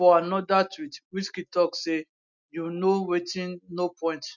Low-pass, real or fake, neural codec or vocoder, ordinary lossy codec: 7.2 kHz; real; none; none